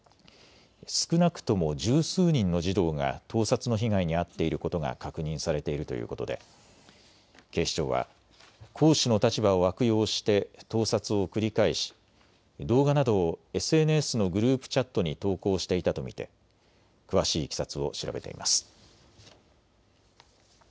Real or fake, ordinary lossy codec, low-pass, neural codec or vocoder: real; none; none; none